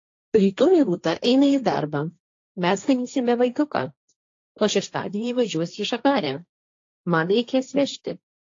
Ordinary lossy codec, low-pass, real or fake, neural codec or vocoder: AAC, 48 kbps; 7.2 kHz; fake; codec, 16 kHz, 1.1 kbps, Voila-Tokenizer